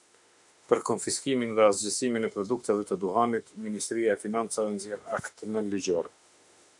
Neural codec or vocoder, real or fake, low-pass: autoencoder, 48 kHz, 32 numbers a frame, DAC-VAE, trained on Japanese speech; fake; 10.8 kHz